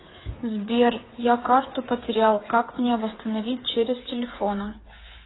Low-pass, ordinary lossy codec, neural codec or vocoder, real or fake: 7.2 kHz; AAC, 16 kbps; codec, 16 kHz, 8 kbps, FreqCodec, smaller model; fake